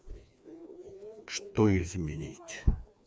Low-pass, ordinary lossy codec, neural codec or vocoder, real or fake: none; none; codec, 16 kHz, 2 kbps, FreqCodec, larger model; fake